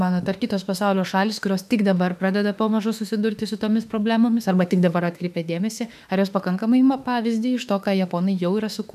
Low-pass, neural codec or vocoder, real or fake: 14.4 kHz; autoencoder, 48 kHz, 32 numbers a frame, DAC-VAE, trained on Japanese speech; fake